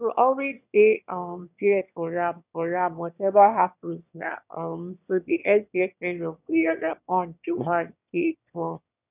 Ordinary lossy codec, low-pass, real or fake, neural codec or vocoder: none; 3.6 kHz; fake; autoencoder, 22.05 kHz, a latent of 192 numbers a frame, VITS, trained on one speaker